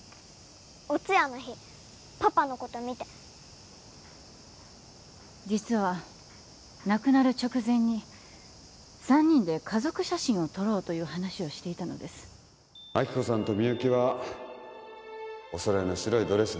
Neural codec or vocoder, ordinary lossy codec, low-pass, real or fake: none; none; none; real